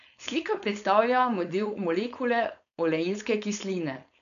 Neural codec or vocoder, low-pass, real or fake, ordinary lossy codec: codec, 16 kHz, 4.8 kbps, FACodec; 7.2 kHz; fake; none